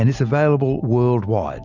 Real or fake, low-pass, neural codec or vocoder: real; 7.2 kHz; none